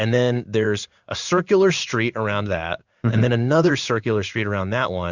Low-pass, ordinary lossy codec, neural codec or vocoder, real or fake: 7.2 kHz; Opus, 64 kbps; codec, 16 kHz in and 24 kHz out, 1 kbps, XY-Tokenizer; fake